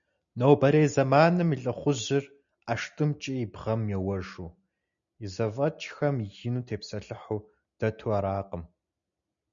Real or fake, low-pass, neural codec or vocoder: real; 7.2 kHz; none